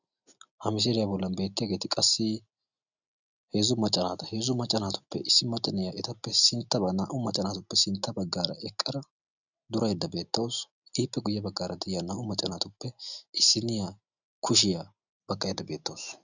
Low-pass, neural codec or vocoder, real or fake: 7.2 kHz; none; real